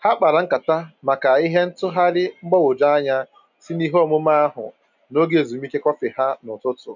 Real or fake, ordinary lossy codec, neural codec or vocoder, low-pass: real; none; none; 7.2 kHz